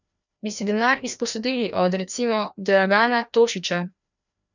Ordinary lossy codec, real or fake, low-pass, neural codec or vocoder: none; fake; 7.2 kHz; codec, 16 kHz, 1 kbps, FreqCodec, larger model